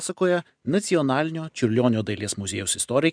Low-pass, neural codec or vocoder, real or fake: 9.9 kHz; none; real